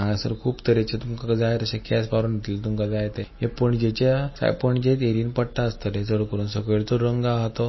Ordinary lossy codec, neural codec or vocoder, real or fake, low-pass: MP3, 24 kbps; none; real; 7.2 kHz